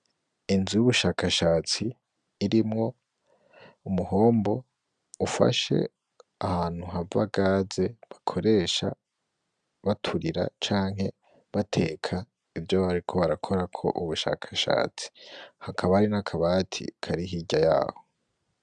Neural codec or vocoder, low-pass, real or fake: none; 9.9 kHz; real